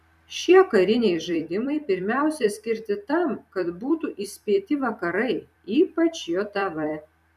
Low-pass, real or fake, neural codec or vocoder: 14.4 kHz; fake; vocoder, 44.1 kHz, 128 mel bands every 256 samples, BigVGAN v2